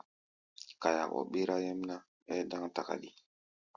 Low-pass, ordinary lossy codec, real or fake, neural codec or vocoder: 7.2 kHz; Opus, 64 kbps; real; none